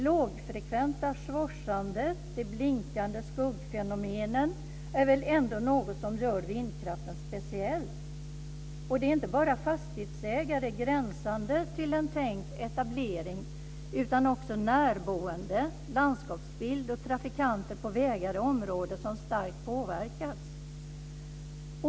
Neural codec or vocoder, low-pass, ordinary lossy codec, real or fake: none; none; none; real